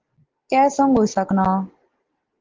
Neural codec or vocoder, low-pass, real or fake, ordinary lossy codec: none; 7.2 kHz; real; Opus, 16 kbps